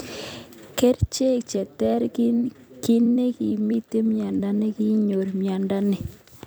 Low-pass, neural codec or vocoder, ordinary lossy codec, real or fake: none; vocoder, 44.1 kHz, 128 mel bands every 256 samples, BigVGAN v2; none; fake